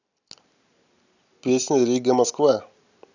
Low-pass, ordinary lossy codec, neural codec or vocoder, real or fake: 7.2 kHz; none; none; real